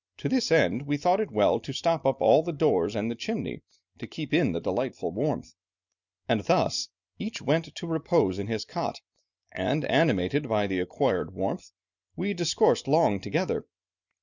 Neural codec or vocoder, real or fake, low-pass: none; real; 7.2 kHz